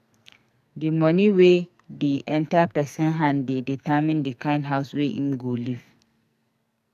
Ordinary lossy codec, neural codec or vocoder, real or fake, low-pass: none; codec, 44.1 kHz, 2.6 kbps, SNAC; fake; 14.4 kHz